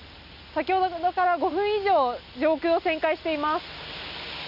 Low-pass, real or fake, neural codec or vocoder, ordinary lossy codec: 5.4 kHz; real; none; none